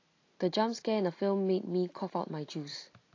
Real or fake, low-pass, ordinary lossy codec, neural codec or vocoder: real; 7.2 kHz; AAC, 32 kbps; none